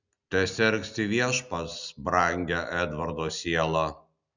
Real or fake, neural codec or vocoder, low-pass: real; none; 7.2 kHz